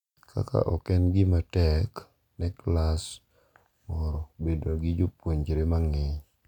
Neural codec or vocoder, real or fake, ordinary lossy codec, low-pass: none; real; none; 19.8 kHz